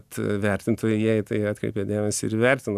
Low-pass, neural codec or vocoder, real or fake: 14.4 kHz; none; real